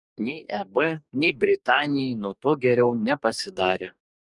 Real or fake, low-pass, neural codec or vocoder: fake; 10.8 kHz; codec, 44.1 kHz, 2.6 kbps, DAC